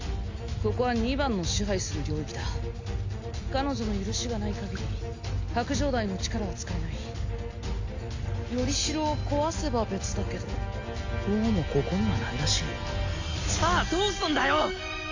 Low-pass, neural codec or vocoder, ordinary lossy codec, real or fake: 7.2 kHz; none; AAC, 48 kbps; real